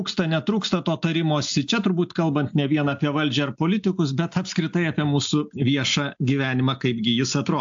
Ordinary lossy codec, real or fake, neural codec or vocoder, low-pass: AAC, 64 kbps; real; none; 7.2 kHz